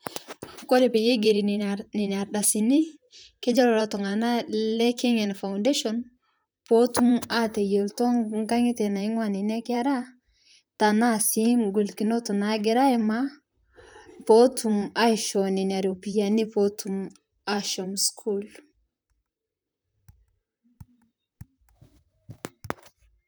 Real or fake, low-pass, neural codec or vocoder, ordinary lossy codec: fake; none; vocoder, 44.1 kHz, 128 mel bands, Pupu-Vocoder; none